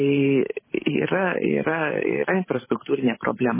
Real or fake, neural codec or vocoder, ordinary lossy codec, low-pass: fake; vocoder, 44.1 kHz, 128 mel bands every 512 samples, BigVGAN v2; MP3, 16 kbps; 3.6 kHz